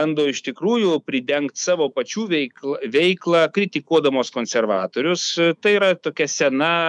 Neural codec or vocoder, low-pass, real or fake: autoencoder, 48 kHz, 128 numbers a frame, DAC-VAE, trained on Japanese speech; 10.8 kHz; fake